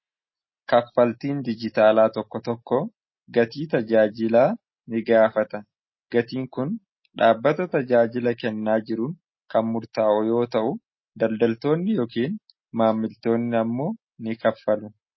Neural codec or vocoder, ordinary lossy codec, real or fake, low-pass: none; MP3, 24 kbps; real; 7.2 kHz